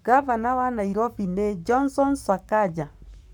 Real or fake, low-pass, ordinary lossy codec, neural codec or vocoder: fake; 19.8 kHz; none; codec, 44.1 kHz, 7.8 kbps, Pupu-Codec